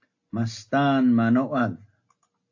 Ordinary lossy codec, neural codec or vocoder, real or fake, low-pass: AAC, 48 kbps; none; real; 7.2 kHz